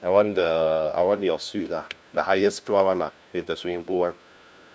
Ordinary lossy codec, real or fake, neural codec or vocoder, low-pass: none; fake; codec, 16 kHz, 1 kbps, FunCodec, trained on LibriTTS, 50 frames a second; none